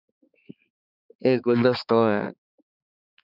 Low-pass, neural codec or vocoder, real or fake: 5.4 kHz; codec, 16 kHz, 4 kbps, X-Codec, HuBERT features, trained on balanced general audio; fake